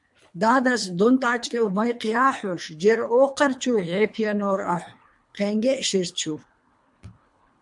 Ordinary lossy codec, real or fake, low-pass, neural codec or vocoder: MP3, 64 kbps; fake; 10.8 kHz; codec, 24 kHz, 3 kbps, HILCodec